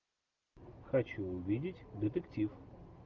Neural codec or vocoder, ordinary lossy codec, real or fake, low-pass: none; Opus, 32 kbps; real; 7.2 kHz